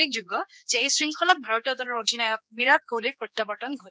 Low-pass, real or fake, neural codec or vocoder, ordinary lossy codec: none; fake; codec, 16 kHz, 2 kbps, X-Codec, HuBERT features, trained on general audio; none